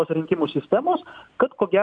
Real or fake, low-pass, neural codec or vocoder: real; 9.9 kHz; none